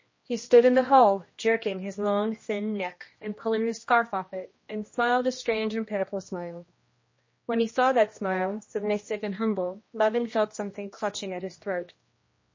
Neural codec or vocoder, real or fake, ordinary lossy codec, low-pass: codec, 16 kHz, 1 kbps, X-Codec, HuBERT features, trained on general audio; fake; MP3, 32 kbps; 7.2 kHz